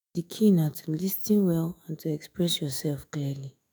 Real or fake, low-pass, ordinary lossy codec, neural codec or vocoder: fake; none; none; autoencoder, 48 kHz, 128 numbers a frame, DAC-VAE, trained on Japanese speech